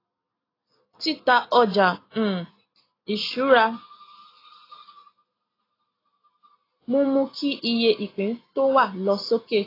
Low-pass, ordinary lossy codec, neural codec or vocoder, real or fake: 5.4 kHz; AAC, 24 kbps; none; real